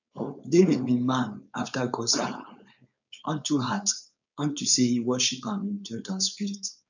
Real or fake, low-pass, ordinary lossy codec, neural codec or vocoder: fake; 7.2 kHz; none; codec, 16 kHz, 4.8 kbps, FACodec